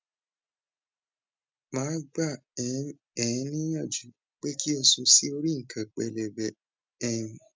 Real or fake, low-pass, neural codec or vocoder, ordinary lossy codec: real; none; none; none